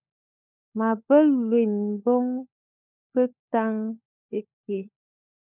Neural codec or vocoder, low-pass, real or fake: codec, 16 kHz, 16 kbps, FunCodec, trained on LibriTTS, 50 frames a second; 3.6 kHz; fake